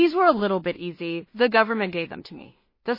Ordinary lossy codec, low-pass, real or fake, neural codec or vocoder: MP3, 24 kbps; 5.4 kHz; fake; codec, 16 kHz in and 24 kHz out, 0.4 kbps, LongCat-Audio-Codec, two codebook decoder